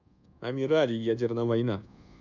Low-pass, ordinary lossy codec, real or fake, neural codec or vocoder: 7.2 kHz; none; fake; codec, 24 kHz, 1.2 kbps, DualCodec